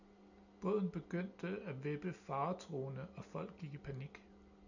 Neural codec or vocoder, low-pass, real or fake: none; 7.2 kHz; real